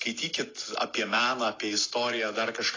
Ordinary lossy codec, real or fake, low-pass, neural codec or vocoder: AAC, 32 kbps; real; 7.2 kHz; none